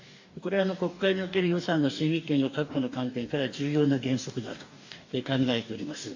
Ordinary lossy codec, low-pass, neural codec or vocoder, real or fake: AAC, 48 kbps; 7.2 kHz; codec, 44.1 kHz, 2.6 kbps, DAC; fake